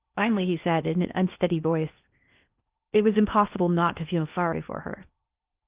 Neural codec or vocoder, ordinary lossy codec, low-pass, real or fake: codec, 16 kHz in and 24 kHz out, 0.8 kbps, FocalCodec, streaming, 65536 codes; Opus, 24 kbps; 3.6 kHz; fake